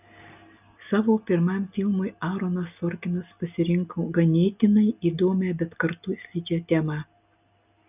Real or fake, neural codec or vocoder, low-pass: real; none; 3.6 kHz